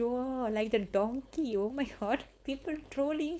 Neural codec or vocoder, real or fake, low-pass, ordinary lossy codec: codec, 16 kHz, 4.8 kbps, FACodec; fake; none; none